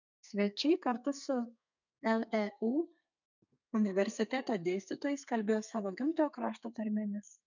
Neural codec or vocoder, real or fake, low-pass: codec, 32 kHz, 1.9 kbps, SNAC; fake; 7.2 kHz